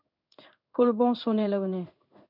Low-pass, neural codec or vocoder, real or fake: 5.4 kHz; codec, 16 kHz in and 24 kHz out, 1 kbps, XY-Tokenizer; fake